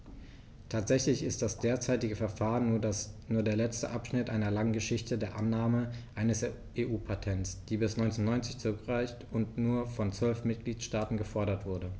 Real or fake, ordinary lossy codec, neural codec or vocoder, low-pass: real; none; none; none